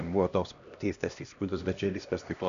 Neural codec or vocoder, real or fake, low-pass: codec, 16 kHz, 1 kbps, X-Codec, HuBERT features, trained on LibriSpeech; fake; 7.2 kHz